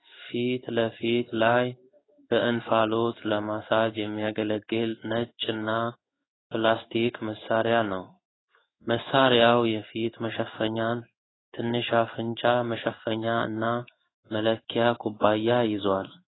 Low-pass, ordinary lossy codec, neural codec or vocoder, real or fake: 7.2 kHz; AAC, 16 kbps; codec, 16 kHz in and 24 kHz out, 1 kbps, XY-Tokenizer; fake